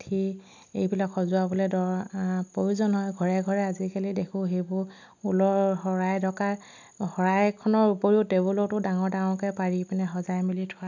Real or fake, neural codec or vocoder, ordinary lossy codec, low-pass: real; none; none; 7.2 kHz